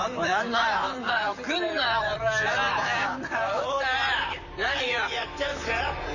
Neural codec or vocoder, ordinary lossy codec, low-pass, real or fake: vocoder, 44.1 kHz, 128 mel bands, Pupu-Vocoder; none; 7.2 kHz; fake